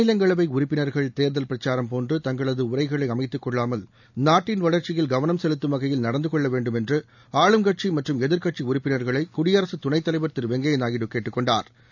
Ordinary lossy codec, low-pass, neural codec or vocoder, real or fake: none; 7.2 kHz; none; real